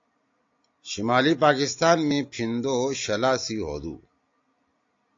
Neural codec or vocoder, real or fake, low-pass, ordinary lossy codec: none; real; 7.2 kHz; AAC, 48 kbps